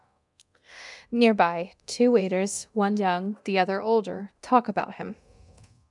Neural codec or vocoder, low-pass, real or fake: codec, 24 kHz, 0.9 kbps, DualCodec; 10.8 kHz; fake